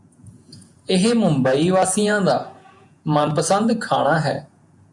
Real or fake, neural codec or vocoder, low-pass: fake; vocoder, 48 kHz, 128 mel bands, Vocos; 10.8 kHz